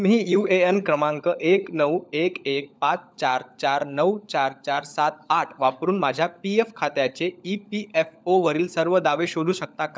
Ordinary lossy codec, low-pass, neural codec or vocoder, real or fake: none; none; codec, 16 kHz, 16 kbps, FunCodec, trained on LibriTTS, 50 frames a second; fake